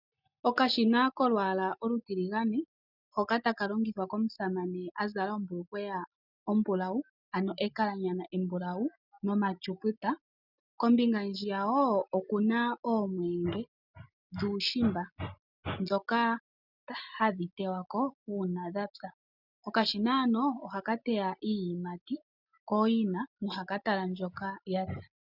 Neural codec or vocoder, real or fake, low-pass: none; real; 5.4 kHz